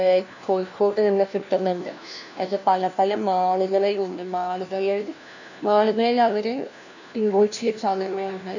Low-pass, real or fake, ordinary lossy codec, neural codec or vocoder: 7.2 kHz; fake; none; codec, 16 kHz, 1 kbps, FunCodec, trained on LibriTTS, 50 frames a second